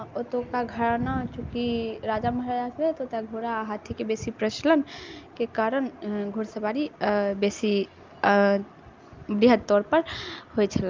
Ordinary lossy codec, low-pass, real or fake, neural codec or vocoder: Opus, 24 kbps; 7.2 kHz; real; none